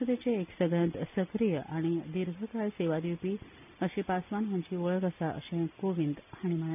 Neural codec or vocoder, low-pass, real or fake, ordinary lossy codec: none; 3.6 kHz; real; none